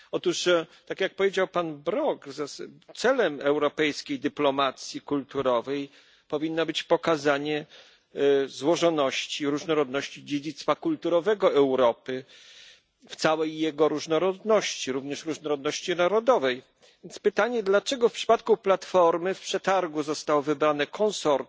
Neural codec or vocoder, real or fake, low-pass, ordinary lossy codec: none; real; none; none